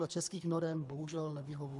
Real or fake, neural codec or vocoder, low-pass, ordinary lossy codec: fake; codec, 24 kHz, 3 kbps, HILCodec; 10.8 kHz; MP3, 96 kbps